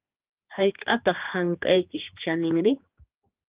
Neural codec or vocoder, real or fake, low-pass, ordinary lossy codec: codec, 16 kHz, 2 kbps, X-Codec, HuBERT features, trained on general audio; fake; 3.6 kHz; Opus, 24 kbps